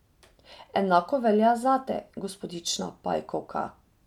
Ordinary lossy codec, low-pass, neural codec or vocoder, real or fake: none; 19.8 kHz; none; real